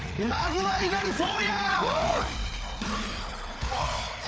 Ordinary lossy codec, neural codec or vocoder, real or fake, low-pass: none; codec, 16 kHz, 4 kbps, FreqCodec, larger model; fake; none